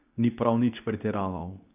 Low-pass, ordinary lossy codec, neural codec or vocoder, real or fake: 3.6 kHz; none; codec, 24 kHz, 0.9 kbps, WavTokenizer, medium speech release version 2; fake